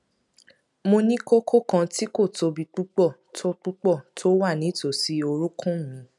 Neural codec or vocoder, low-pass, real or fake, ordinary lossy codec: none; 10.8 kHz; real; none